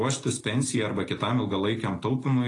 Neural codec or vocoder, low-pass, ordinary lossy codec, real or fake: none; 10.8 kHz; AAC, 32 kbps; real